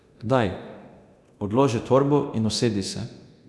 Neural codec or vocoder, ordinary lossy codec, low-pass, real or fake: codec, 24 kHz, 0.9 kbps, DualCodec; none; none; fake